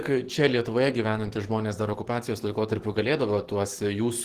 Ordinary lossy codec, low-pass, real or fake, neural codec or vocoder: Opus, 16 kbps; 14.4 kHz; fake; codec, 44.1 kHz, 7.8 kbps, DAC